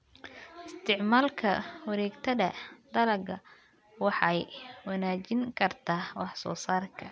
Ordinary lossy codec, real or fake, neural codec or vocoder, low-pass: none; real; none; none